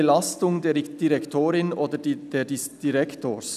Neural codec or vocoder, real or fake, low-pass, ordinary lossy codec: none; real; 14.4 kHz; none